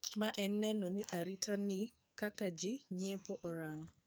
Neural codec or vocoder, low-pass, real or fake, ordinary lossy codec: codec, 44.1 kHz, 2.6 kbps, SNAC; none; fake; none